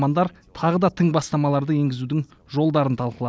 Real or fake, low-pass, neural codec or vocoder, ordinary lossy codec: real; none; none; none